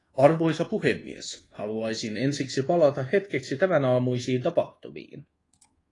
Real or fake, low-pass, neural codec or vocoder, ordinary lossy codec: fake; 10.8 kHz; codec, 24 kHz, 1.2 kbps, DualCodec; AAC, 32 kbps